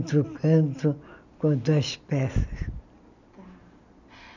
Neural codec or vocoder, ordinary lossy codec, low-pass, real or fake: none; none; 7.2 kHz; real